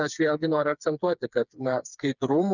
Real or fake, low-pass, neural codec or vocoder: fake; 7.2 kHz; codec, 16 kHz, 4 kbps, FreqCodec, smaller model